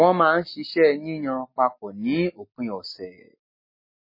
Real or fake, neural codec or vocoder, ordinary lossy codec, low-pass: real; none; MP3, 24 kbps; 5.4 kHz